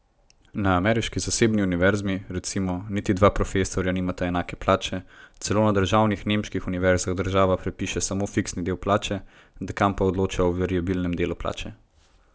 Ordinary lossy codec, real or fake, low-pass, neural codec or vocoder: none; real; none; none